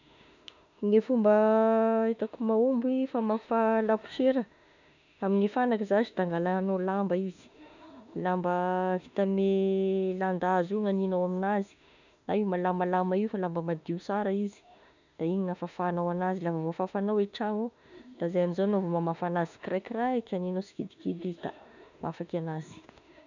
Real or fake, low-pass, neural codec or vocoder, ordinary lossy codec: fake; 7.2 kHz; autoencoder, 48 kHz, 32 numbers a frame, DAC-VAE, trained on Japanese speech; none